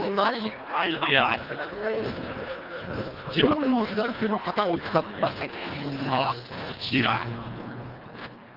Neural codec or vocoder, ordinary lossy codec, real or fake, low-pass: codec, 24 kHz, 1.5 kbps, HILCodec; Opus, 24 kbps; fake; 5.4 kHz